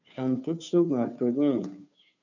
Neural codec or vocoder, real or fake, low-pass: codec, 16 kHz, 2 kbps, FunCodec, trained on Chinese and English, 25 frames a second; fake; 7.2 kHz